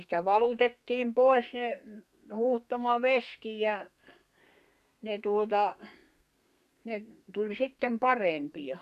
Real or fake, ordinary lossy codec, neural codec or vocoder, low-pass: fake; none; codec, 32 kHz, 1.9 kbps, SNAC; 14.4 kHz